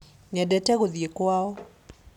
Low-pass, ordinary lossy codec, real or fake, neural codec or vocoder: 19.8 kHz; none; real; none